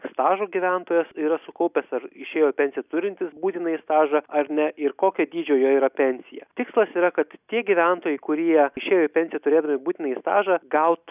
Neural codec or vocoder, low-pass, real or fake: none; 3.6 kHz; real